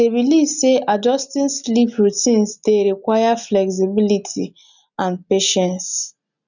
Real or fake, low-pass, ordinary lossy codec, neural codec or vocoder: real; 7.2 kHz; none; none